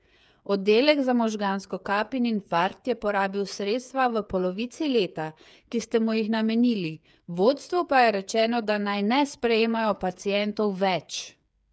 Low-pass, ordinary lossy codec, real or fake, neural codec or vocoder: none; none; fake; codec, 16 kHz, 8 kbps, FreqCodec, smaller model